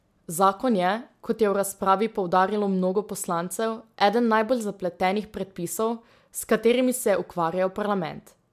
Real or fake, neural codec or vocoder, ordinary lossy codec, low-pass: real; none; MP3, 96 kbps; 14.4 kHz